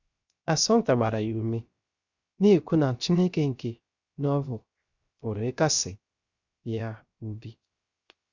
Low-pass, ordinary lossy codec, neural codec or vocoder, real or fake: 7.2 kHz; Opus, 64 kbps; codec, 16 kHz, 0.3 kbps, FocalCodec; fake